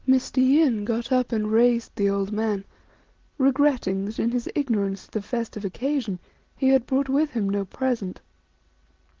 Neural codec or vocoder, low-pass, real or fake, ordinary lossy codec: none; 7.2 kHz; real; Opus, 16 kbps